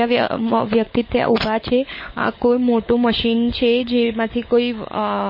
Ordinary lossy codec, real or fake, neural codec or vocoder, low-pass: MP3, 24 kbps; fake; codec, 16 kHz, 16 kbps, FunCodec, trained on LibriTTS, 50 frames a second; 5.4 kHz